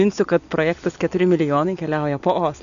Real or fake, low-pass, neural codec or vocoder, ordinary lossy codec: real; 7.2 kHz; none; MP3, 96 kbps